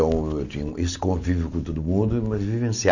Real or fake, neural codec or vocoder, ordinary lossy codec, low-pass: real; none; none; 7.2 kHz